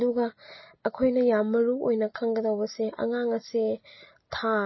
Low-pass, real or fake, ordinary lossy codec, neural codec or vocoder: 7.2 kHz; real; MP3, 24 kbps; none